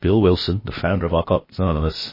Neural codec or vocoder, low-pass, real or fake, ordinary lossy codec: codec, 16 kHz, about 1 kbps, DyCAST, with the encoder's durations; 5.4 kHz; fake; MP3, 24 kbps